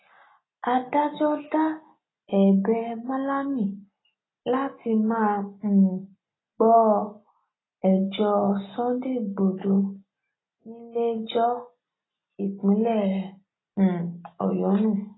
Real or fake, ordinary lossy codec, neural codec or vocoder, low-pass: real; AAC, 16 kbps; none; 7.2 kHz